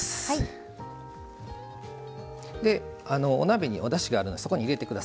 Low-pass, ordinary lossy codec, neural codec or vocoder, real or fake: none; none; none; real